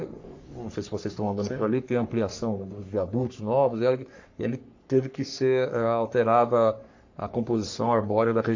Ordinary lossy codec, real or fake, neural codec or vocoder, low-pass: AAC, 48 kbps; fake; codec, 44.1 kHz, 3.4 kbps, Pupu-Codec; 7.2 kHz